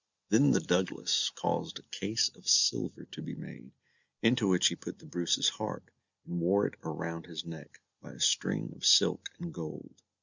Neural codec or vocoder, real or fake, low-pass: none; real; 7.2 kHz